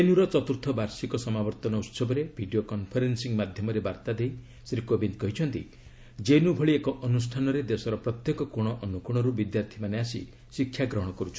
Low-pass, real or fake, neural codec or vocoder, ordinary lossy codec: none; real; none; none